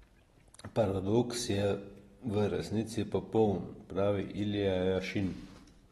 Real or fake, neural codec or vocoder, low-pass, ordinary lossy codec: real; none; 14.4 kHz; AAC, 32 kbps